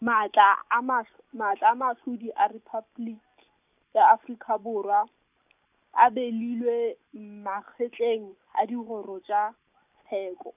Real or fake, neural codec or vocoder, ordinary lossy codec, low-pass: real; none; none; 3.6 kHz